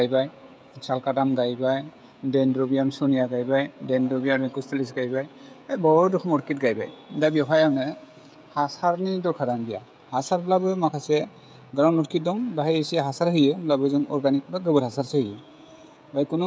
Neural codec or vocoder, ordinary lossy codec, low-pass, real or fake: codec, 16 kHz, 16 kbps, FreqCodec, smaller model; none; none; fake